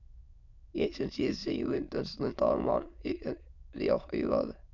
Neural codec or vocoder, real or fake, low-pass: autoencoder, 22.05 kHz, a latent of 192 numbers a frame, VITS, trained on many speakers; fake; 7.2 kHz